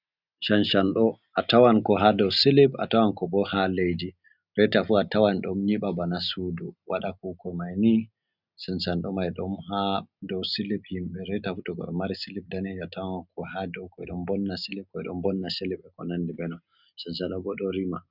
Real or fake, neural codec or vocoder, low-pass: real; none; 5.4 kHz